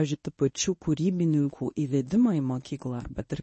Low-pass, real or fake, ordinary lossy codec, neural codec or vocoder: 10.8 kHz; fake; MP3, 32 kbps; codec, 24 kHz, 0.9 kbps, WavTokenizer, medium speech release version 1